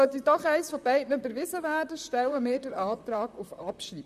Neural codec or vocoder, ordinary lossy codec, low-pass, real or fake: vocoder, 44.1 kHz, 128 mel bands, Pupu-Vocoder; none; 14.4 kHz; fake